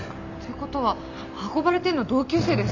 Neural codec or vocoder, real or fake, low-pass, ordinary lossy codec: none; real; 7.2 kHz; none